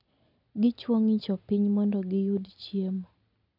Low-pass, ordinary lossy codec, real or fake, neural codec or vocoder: 5.4 kHz; none; real; none